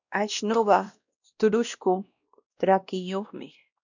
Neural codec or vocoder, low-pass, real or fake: codec, 16 kHz, 1 kbps, X-Codec, WavLM features, trained on Multilingual LibriSpeech; 7.2 kHz; fake